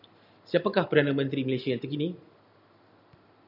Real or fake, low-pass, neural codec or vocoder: real; 5.4 kHz; none